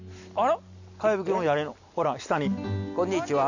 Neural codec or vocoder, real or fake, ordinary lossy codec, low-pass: none; real; none; 7.2 kHz